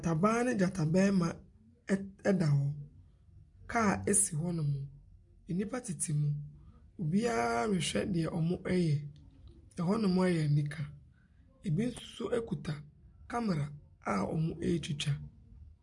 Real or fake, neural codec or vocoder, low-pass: real; none; 10.8 kHz